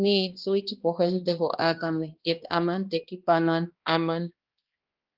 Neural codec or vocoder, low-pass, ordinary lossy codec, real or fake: codec, 16 kHz, 1 kbps, X-Codec, HuBERT features, trained on balanced general audio; 5.4 kHz; Opus, 32 kbps; fake